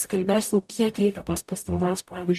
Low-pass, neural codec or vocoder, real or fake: 14.4 kHz; codec, 44.1 kHz, 0.9 kbps, DAC; fake